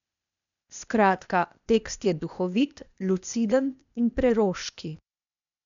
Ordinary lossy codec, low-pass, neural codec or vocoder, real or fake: none; 7.2 kHz; codec, 16 kHz, 0.8 kbps, ZipCodec; fake